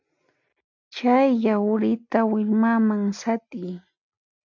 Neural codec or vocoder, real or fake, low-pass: none; real; 7.2 kHz